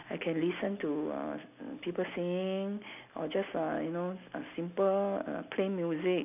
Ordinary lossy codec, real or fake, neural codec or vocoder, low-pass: none; real; none; 3.6 kHz